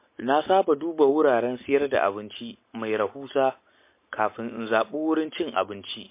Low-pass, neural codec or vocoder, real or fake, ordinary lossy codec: 3.6 kHz; none; real; MP3, 24 kbps